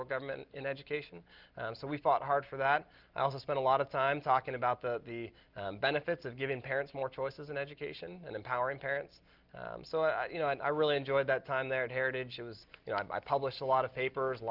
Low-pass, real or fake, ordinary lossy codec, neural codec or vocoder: 5.4 kHz; real; Opus, 24 kbps; none